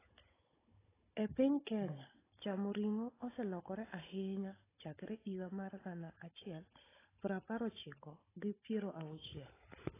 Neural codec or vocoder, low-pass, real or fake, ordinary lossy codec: codec, 16 kHz, 16 kbps, FunCodec, trained on Chinese and English, 50 frames a second; 3.6 kHz; fake; AAC, 16 kbps